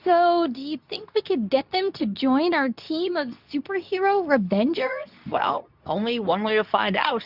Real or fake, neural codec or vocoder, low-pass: fake; codec, 24 kHz, 0.9 kbps, WavTokenizer, medium speech release version 2; 5.4 kHz